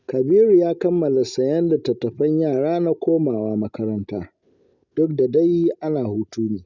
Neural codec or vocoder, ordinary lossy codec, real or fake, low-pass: none; none; real; 7.2 kHz